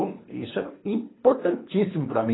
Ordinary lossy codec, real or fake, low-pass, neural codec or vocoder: AAC, 16 kbps; fake; 7.2 kHz; codec, 24 kHz, 3 kbps, HILCodec